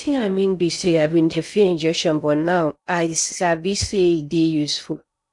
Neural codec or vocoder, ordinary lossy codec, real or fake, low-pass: codec, 16 kHz in and 24 kHz out, 0.6 kbps, FocalCodec, streaming, 2048 codes; none; fake; 10.8 kHz